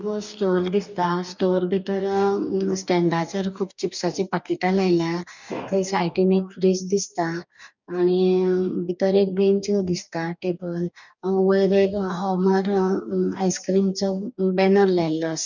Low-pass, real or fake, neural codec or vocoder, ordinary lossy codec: 7.2 kHz; fake; codec, 44.1 kHz, 2.6 kbps, DAC; none